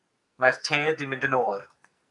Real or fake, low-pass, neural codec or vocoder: fake; 10.8 kHz; codec, 44.1 kHz, 2.6 kbps, SNAC